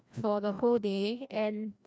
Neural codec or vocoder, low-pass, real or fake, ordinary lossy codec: codec, 16 kHz, 1 kbps, FreqCodec, larger model; none; fake; none